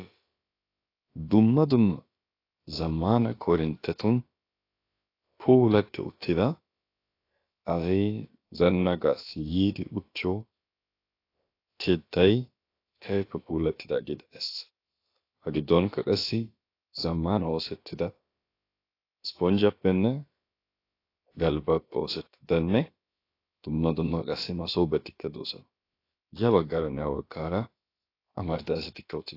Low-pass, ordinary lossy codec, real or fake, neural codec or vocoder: 5.4 kHz; AAC, 32 kbps; fake; codec, 16 kHz, about 1 kbps, DyCAST, with the encoder's durations